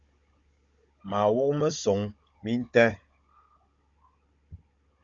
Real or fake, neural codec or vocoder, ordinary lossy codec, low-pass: fake; codec, 16 kHz, 16 kbps, FunCodec, trained on Chinese and English, 50 frames a second; Opus, 64 kbps; 7.2 kHz